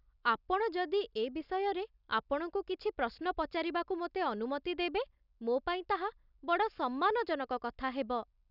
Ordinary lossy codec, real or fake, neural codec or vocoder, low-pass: none; real; none; 5.4 kHz